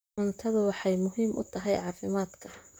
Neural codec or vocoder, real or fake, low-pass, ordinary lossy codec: vocoder, 44.1 kHz, 128 mel bands, Pupu-Vocoder; fake; none; none